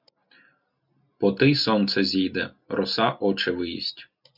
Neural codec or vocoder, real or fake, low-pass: none; real; 5.4 kHz